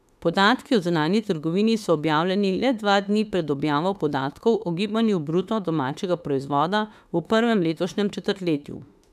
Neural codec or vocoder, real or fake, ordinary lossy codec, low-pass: autoencoder, 48 kHz, 32 numbers a frame, DAC-VAE, trained on Japanese speech; fake; none; 14.4 kHz